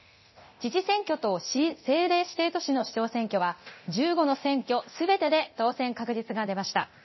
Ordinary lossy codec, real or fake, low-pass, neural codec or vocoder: MP3, 24 kbps; fake; 7.2 kHz; codec, 24 kHz, 0.9 kbps, DualCodec